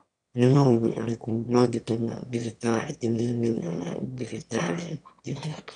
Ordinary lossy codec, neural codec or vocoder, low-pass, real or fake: none; autoencoder, 22.05 kHz, a latent of 192 numbers a frame, VITS, trained on one speaker; 9.9 kHz; fake